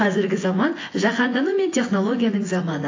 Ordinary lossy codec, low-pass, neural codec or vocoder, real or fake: AAC, 32 kbps; 7.2 kHz; vocoder, 24 kHz, 100 mel bands, Vocos; fake